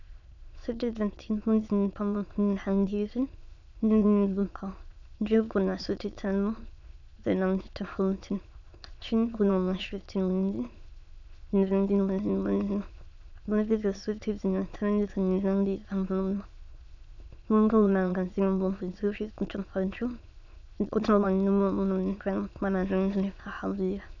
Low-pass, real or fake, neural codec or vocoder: 7.2 kHz; fake; autoencoder, 22.05 kHz, a latent of 192 numbers a frame, VITS, trained on many speakers